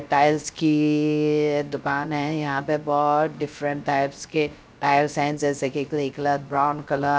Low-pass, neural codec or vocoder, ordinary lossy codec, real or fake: none; codec, 16 kHz, 0.3 kbps, FocalCodec; none; fake